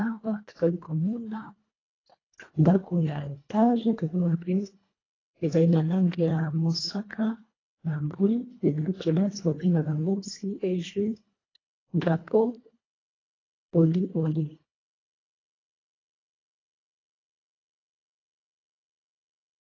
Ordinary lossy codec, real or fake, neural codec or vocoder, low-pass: AAC, 32 kbps; fake; codec, 24 kHz, 1.5 kbps, HILCodec; 7.2 kHz